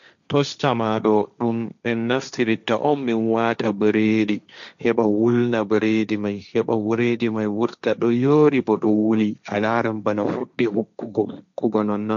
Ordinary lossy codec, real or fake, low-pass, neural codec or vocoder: none; fake; 7.2 kHz; codec, 16 kHz, 1.1 kbps, Voila-Tokenizer